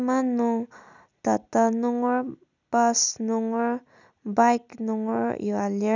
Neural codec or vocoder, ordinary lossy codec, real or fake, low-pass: none; none; real; 7.2 kHz